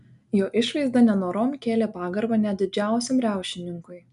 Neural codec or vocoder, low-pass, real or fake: none; 10.8 kHz; real